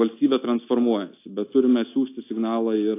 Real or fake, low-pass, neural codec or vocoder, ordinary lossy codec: real; 3.6 kHz; none; AAC, 24 kbps